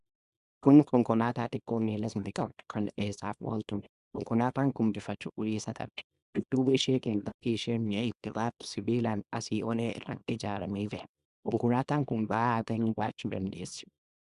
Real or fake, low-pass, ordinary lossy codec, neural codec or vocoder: fake; 10.8 kHz; Opus, 64 kbps; codec, 24 kHz, 0.9 kbps, WavTokenizer, small release